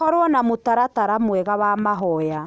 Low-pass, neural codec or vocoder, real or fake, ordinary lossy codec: none; none; real; none